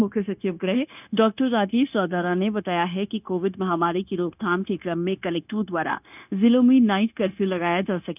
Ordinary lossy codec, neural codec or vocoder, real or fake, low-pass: none; codec, 16 kHz, 0.9 kbps, LongCat-Audio-Codec; fake; 3.6 kHz